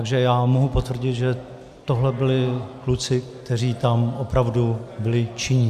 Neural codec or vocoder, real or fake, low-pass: none; real; 14.4 kHz